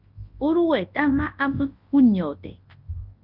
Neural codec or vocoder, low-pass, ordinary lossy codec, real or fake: codec, 24 kHz, 0.9 kbps, WavTokenizer, large speech release; 5.4 kHz; Opus, 32 kbps; fake